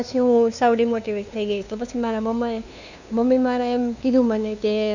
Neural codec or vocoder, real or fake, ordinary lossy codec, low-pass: codec, 16 kHz, 2 kbps, FunCodec, trained on LibriTTS, 25 frames a second; fake; none; 7.2 kHz